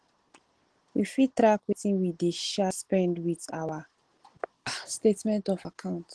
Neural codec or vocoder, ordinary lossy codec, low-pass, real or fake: none; Opus, 16 kbps; 10.8 kHz; real